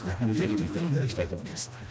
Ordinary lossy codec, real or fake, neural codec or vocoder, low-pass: none; fake; codec, 16 kHz, 1 kbps, FreqCodec, smaller model; none